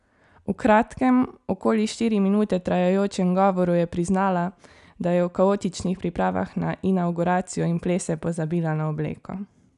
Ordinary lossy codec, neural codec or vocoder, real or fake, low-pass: none; none; real; 10.8 kHz